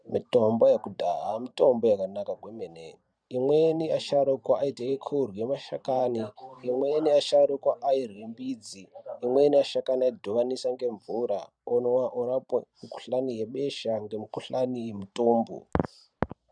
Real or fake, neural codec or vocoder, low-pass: fake; vocoder, 44.1 kHz, 128 mel bands every 512 samples, BigVGAN v2; 9.9 kHz